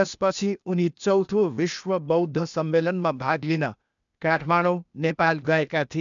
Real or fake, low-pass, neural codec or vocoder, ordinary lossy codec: fake; 7.2 kHz; codec, 16 kHz, 0.8 kbps, ZipCodec; none